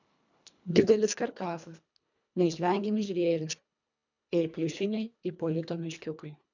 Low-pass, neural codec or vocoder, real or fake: 7.2 kHz; codec, 24 kHz, 1.5 kbps, HILCodec; fake